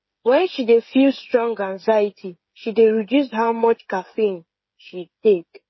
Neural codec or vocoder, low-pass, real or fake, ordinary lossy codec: codec, 16 kHz, 8 kbps, FreqCodec, smaller model; 7.2 kHz; fake; MP3, 24 kbps